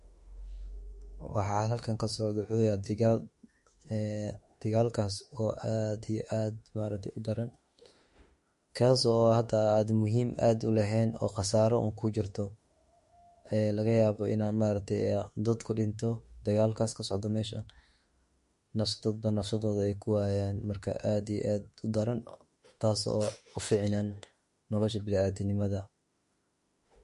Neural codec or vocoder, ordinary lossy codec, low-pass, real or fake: autoencoder, 48 kHz, 32 numbers a frame, DAC-VAE, trained on Japanese speech; MP3, 48 kbps; 14.4 kHz; fake